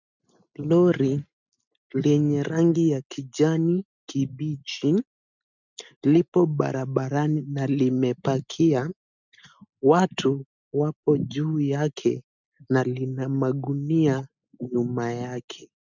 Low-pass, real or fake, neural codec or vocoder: 7.2 kHz; real; none